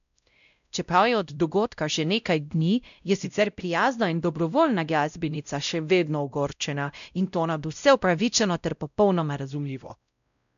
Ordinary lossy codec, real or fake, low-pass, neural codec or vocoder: none; fake; 7.2 kHz; codec, 16 kHz, 0.5 kbps, X-Codec, WavLM features, trained on Multilingual LibriSpeech